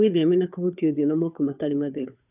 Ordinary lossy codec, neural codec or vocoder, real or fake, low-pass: none; codec, 16 kHz, 2 kbps, FunCodec, trained on Chinese and English, 25 frames a second; fake; 3.6 kHz